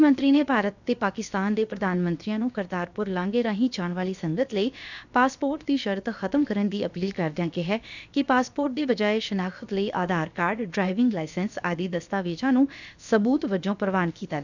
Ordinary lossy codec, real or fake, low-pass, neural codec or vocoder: none; fake; 7.2 kHz; codec, 16 kHz, about 1 kbps, DyCAST, with the encoder's durations